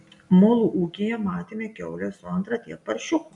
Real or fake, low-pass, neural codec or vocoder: real; 10.8 kHz; none